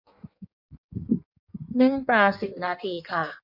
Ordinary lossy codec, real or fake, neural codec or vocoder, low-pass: none; fake; codec, 16 kHz in and 24 kHz out, 1.1 kbps, FireRedTTS-2 codec; 5.4 kHz